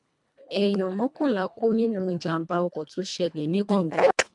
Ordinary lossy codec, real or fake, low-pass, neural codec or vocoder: MP3, 96 kbps; fake; 10.8 kHz; codec, 24 kHz, 1.5 kbps, HILCodec